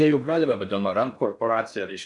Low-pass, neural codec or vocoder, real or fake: 10.8 kHz; codec, 16 kHz in and 24 kHz out, 0.6 kbps, FocalCodec, streaming, 4096 codes; fake